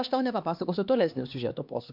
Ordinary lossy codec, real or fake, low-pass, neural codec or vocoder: MP3, 48 kbps; fake; 5.4 kHz; codec, 16 kHz, 2 kbps, X-Codec, HuBERT features, trained on LibriSpeech